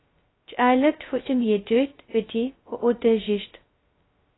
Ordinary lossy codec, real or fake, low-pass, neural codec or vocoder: AAC, 16 kbps; fake; 7.2 kHz; codec, 16 kHz, 0.2 kbps, FocalCodec